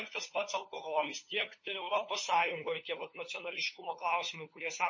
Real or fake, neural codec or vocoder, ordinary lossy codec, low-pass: fake; codec, 16 kHz, 4 kbps, FunCodec, trained on LibriTTS, 50 frames a second; MP3, 32 kbps; 7.2 kHz